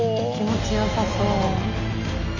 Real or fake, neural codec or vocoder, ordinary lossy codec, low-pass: real; none; none; 7.2 kHz